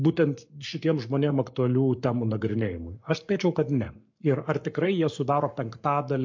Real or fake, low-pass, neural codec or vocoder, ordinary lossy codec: fake; 7.2 kHz; codec, 44.1 kHz, 7.8 kbps, Pupu-Codec; MP3, 48 kbps